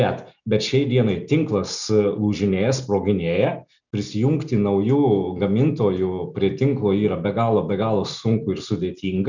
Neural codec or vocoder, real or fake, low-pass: none; real; 7.2 kHz